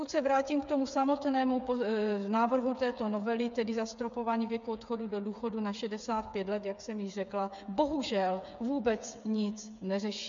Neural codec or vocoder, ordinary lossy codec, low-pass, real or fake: codec, 16 kHz, 8 kbps, FreqCodec, smaller model; MP3, 64 kbps; 7.2 kHz; fake